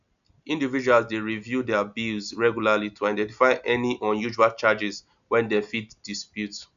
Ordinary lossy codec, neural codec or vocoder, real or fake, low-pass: none; none; real; 7.2 kHz